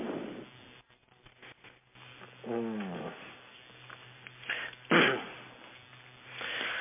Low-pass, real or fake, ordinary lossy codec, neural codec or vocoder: 3.6 kHz; real; MP3, 24 kbps; none